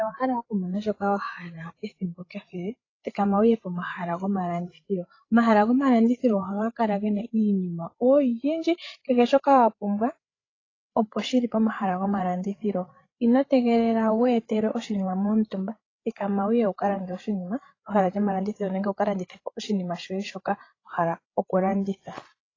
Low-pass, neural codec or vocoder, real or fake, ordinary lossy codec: 7.2 kHz; codec, 16 kHz, 8 kbps, FreqCodec, larger model; fake; AAC, 32 kbps